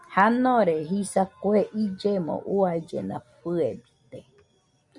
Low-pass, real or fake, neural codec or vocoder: 10.8 kHz; real; none